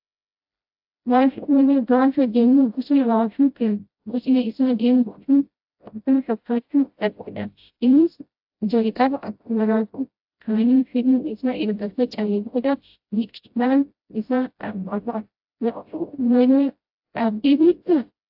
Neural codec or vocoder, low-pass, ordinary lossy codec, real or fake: codec, 16 kHz, 0.5 kbps, FreqCodec, smaller model; 5.4 kHz; AAC, 48 kbps; fake